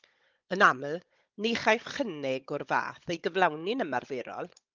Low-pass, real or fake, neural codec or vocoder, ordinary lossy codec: 7.2 kHz; fake; codec, 16 kHz, 16 kbps, FunCodec, trained on Chinese and English, 50 frames a second; Opus, 24 kbps